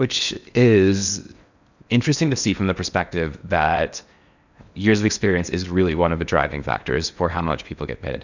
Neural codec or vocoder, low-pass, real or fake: codec, 16 kHz in and 24 kHz out, 0.8 kbps, FocalCodec, streaming, 65536 codes; 7.2 kHz; fake